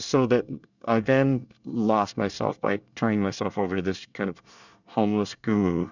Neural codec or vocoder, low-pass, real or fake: codec, 24 kHz, 1 kbps, SNAC; 7.2 kHz; fake